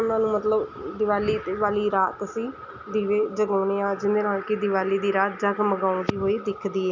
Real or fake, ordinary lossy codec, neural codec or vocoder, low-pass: real; none; none; 7.2 kHz